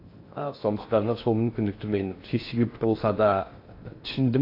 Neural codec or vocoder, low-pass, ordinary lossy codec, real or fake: codec, 16 kHz in and 24 kHz out, 0.6 kbps, FocalCodec, streaming, 2048 codes; 5.4 kHz; AAC, 32 kbps; fake